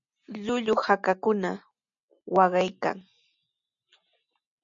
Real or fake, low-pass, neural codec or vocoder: real; 7.2 kHz; none